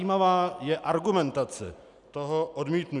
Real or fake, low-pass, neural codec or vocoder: real; 10.8 kHz; none